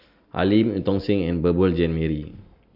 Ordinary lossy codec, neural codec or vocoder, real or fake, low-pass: Opus, 64 kbps; none; real; 5.4 kHz